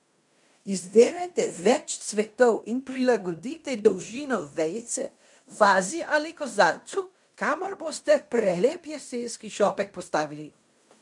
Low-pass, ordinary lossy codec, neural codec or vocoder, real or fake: 10.8 kHz; none; codec, 16 kHz in and 24 kHz out, 0.9 kbps, LongCat-Audio-Codec, fine tuned four codebook decoder; fake